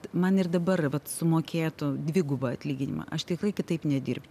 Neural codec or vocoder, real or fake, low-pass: none; real; 14.4 kHz